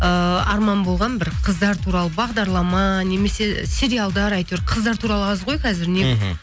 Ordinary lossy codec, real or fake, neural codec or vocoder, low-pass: none; real; none; none